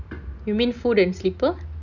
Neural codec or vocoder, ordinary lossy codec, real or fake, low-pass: none; none; real; 7.2 kHz